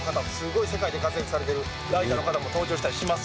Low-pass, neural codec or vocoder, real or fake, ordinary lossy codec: none; none; real; none